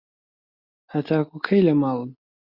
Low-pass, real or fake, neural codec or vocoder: 5.4 kHz; real; none